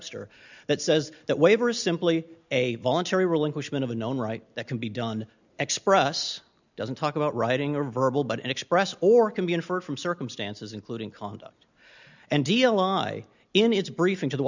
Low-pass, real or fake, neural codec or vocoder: 7.2 kHz; real; none